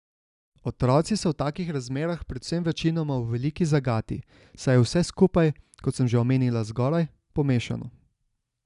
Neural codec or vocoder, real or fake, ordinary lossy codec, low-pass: none; real; none; 10.8 kHz